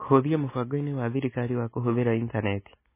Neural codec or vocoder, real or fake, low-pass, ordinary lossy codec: none; real; 3.6 kHz; MP3, 16 kbps